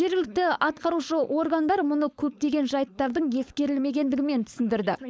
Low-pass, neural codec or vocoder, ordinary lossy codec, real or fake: none; codec, 16 kHz, 4.8 kbps, FACodec; none; fake